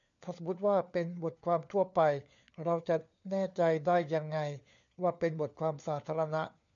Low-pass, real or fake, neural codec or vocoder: 7.2 kHz; fake; codec, 16 kHz, 4 kbps, FunCodec, trained on LibriTTS, 50 frames a second